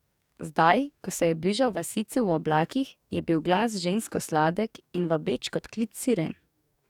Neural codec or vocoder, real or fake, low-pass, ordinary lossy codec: codec, 44.1 kHz, 2.6 kbps, DAC; fake; 19.8 kHz; none